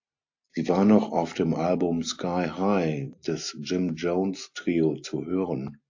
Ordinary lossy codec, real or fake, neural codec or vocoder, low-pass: AAC, 48 kbps; real; none; 7.2 kHz